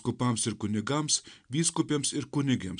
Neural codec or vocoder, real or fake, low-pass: none; real; 9.9 kHz